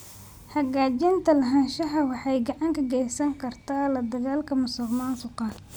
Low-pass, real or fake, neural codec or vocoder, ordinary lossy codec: none; real; none; none